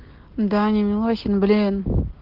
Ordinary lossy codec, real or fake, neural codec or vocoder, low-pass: Opus, 16 kbps; real; none; 5.4 kHz